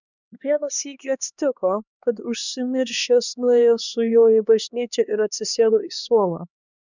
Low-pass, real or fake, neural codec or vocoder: 7.2 kHz; fake; codec, 16 kHz, 2 kbps, X-Codec, HuBERT features, trained on LibriSpeech